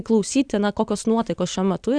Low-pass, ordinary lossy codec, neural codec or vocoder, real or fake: 9.9 kHz; Opus, 64 kbps; none; real